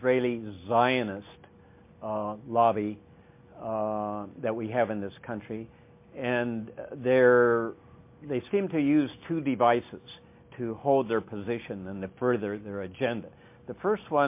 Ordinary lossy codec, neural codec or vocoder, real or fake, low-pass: MP3, 24 kbps; none; real; 3.6 kHz